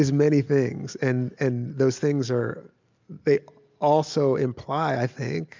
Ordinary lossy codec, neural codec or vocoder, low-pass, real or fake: MP3, 64 kbps; none; 7.2 kHz; real